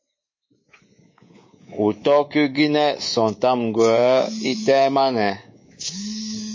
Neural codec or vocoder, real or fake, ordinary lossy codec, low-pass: codec, 24 kHz, 3.1 kbps, DualCodec; fake; MP3, 32 kbps; 7.2 kHz